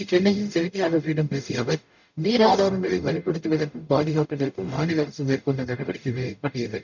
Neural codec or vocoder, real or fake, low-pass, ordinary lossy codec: codec, 44.1 kHz, 0.9 kbps, DAC; fake; 7.2 kHz; none